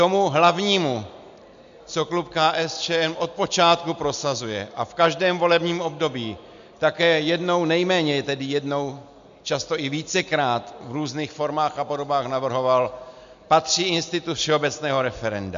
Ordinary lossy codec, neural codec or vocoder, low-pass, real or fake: MP3, 64 kbps; none; 7.2 kHz; real